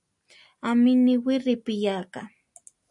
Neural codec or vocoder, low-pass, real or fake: none; 10.8 kHz; real